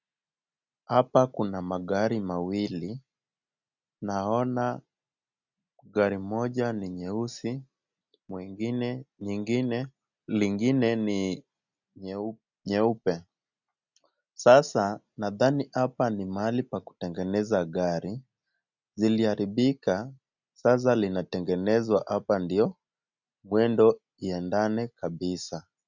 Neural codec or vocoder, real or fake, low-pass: none; real; 7.2 kHz